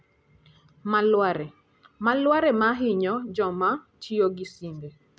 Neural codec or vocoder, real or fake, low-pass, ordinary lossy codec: none; real; none; none